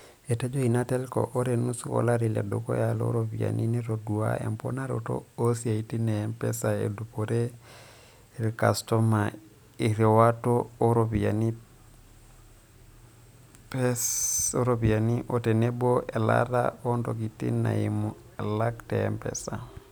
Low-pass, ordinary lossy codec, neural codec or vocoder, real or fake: none; none; none; real